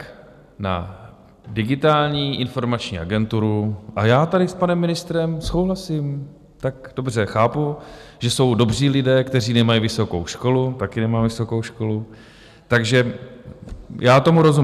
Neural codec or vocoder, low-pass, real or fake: none; 14.4 kHz; real